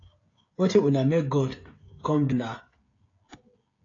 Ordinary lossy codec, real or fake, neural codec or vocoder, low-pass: AAC, 32 kbps; fake; codec, 16 kHz, 16 kbps, FreqCodec, smaller model; 7.2 kHz